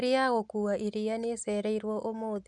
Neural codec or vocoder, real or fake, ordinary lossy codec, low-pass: none; real; none; 10.8 kHz